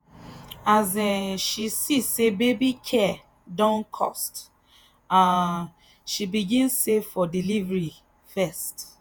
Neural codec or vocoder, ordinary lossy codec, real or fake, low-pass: vocoder, 48 kHz, 128 mel bands, Vocos; none; fake; none